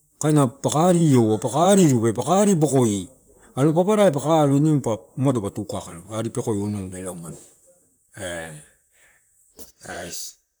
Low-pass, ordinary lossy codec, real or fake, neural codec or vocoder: none; none; fake; vocoder, 44.1 kHz, 128 mel bands, Pupu-Vocoder